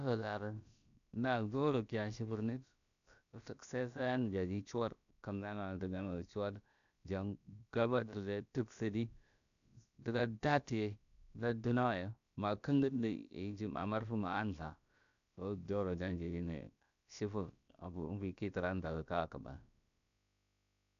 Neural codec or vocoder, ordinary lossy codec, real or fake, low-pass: codec, 16 kHz, about 1 kbps, DyCAST, with the encoder's durations; none; fake; 7.2 kHz